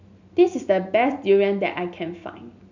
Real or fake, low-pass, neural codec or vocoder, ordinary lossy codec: real; 7.2 kHz; none; none